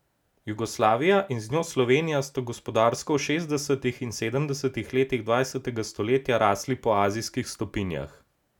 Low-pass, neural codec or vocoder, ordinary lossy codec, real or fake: 19.8 kHz; none; none; real